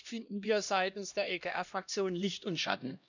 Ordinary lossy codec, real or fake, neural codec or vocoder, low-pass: none; fake; codec, 16 kHz, 1 kbps, X-Codec, HuBERT features, trained on LibriSpeech; 7.2 kHz